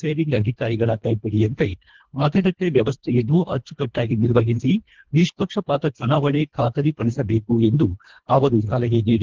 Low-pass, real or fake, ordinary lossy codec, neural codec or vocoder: 7.2 kHz; fake; Opus, 16 kbps; codec, 24 kHz, 1.5 kbps, HILCodec